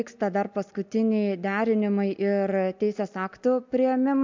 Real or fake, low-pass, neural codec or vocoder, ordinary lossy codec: real; 7.2 kHz; none; MP3, 64 kbps